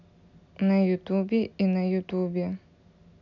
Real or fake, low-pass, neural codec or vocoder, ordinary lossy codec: real; 7.2 kHz; none; none